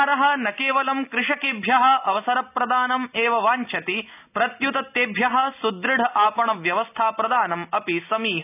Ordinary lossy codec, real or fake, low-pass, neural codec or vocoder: none; real; 3.6 kHz; none